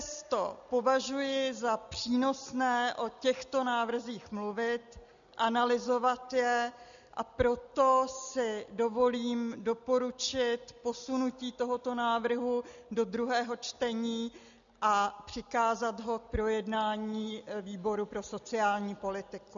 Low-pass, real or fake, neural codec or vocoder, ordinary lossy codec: 7.2 kHz; real; none; MP3, 96 kbps